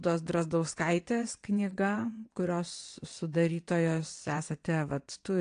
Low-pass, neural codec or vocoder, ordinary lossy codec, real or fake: 9.9 kHz; vocoder, 22.05 kHz, 80 mel bands, WaveNeXt; AAC, 48 kbps; fake